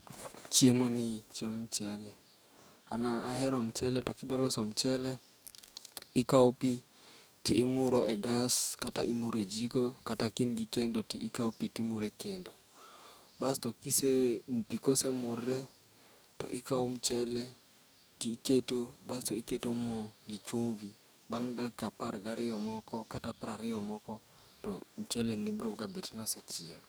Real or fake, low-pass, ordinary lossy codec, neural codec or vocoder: fake; none; none; codec, 44.1 kHz, 2.6 kbps, DAC